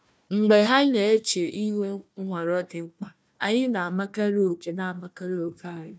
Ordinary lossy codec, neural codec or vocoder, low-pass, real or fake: none; codec, 16 kHz, 1 kbps, FunCodec, trained on Chinese and English, 50 frames a second; none; fake